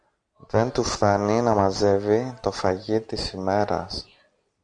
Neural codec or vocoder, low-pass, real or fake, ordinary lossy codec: none; 9.9 kHz; real; MP3, 96 kbps